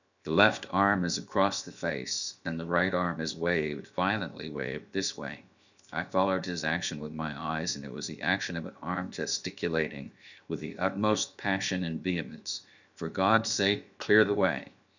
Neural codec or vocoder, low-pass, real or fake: codec, 16 kHz, 0.7 kbps, FocalCodec; 7.2 kHz; fake